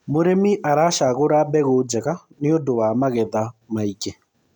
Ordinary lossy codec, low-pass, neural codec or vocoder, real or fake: none; 19.8 kHz; none; real